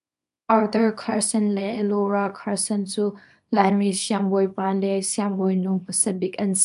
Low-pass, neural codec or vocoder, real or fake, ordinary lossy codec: 10.8 kHz; codec, 24 kHz, 0.9 kbps, WavTokenizer, small release; fake; MP3, 96 kbps